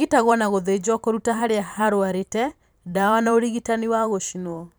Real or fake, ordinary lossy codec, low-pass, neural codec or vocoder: fake; none; none; vocoder, 44.1 kHz, 128 mel bands every 512 samples, BigVGAN v2